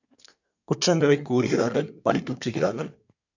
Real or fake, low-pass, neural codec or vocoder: fake; 7.2 kHz; codec, 24 kHz, 1 kbps, SNAC